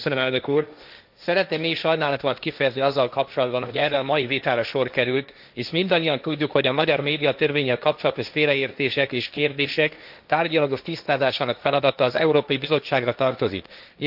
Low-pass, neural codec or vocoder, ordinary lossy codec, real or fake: 5.4 kHz; codec, 16 kHz, 1.1 kbps, Voila-Tokenizer; none; fake